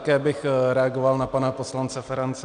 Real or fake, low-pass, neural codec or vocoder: real; 9.9 kHz; none